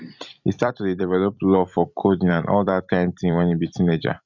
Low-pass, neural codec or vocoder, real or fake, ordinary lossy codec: 7.2 kHz; vocoder, 44.1 kHz, 128 mel bands every 256 samples, BigVGAN v2; fake; none